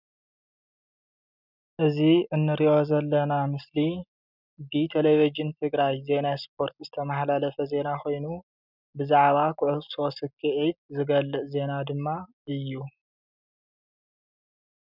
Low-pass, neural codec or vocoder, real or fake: 5.4 kHz; none; real